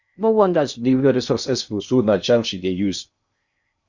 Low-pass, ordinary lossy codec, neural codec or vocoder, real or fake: 7.2 kHz; Opus, 64 kbps; codec, 16 kHz in and 24 kHz out, 0.6 kbps, FocalCodec, streaming, 2048 codes; fake